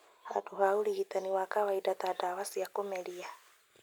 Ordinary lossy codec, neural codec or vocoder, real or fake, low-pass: none; none; real; none